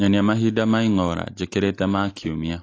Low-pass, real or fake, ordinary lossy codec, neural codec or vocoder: 7.2 kHz; real; AAC, 32 kbps; none